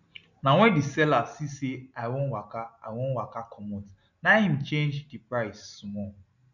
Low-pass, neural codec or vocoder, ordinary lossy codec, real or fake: 7.2 kHz; none; none; real